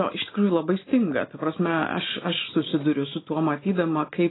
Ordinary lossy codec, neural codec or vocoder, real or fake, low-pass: AAC, 16 kbps; none; real; 7.2 kHz